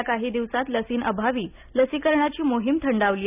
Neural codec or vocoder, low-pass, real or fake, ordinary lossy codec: none; 3.6 kHz; real; none